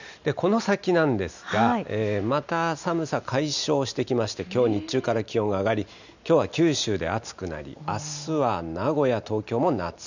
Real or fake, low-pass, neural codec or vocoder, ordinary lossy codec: real; 7.2 kHz; none; none